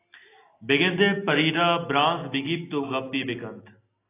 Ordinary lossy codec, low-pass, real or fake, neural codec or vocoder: AAC, 24 kbps; 3.6 kHz; real; none